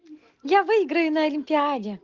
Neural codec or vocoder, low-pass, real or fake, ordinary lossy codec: none; 7.2 kHz; real; Opus, 16 kbps